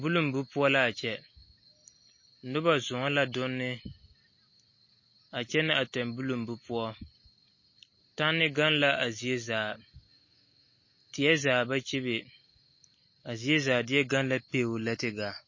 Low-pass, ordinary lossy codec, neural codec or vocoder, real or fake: 7.2 kHz; MP3, 32 kbps; none; real